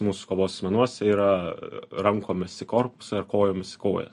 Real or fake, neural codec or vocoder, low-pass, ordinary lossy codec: real; none; 14.4 kHz; MP3, 48 kbps